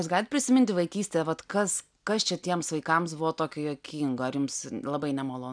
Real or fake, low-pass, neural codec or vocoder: real; 9.9 kHz; none